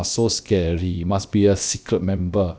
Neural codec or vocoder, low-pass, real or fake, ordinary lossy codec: codec, 16 kHz, about 1 kbps, DyCAST, with the encoder's durations; none; fake; none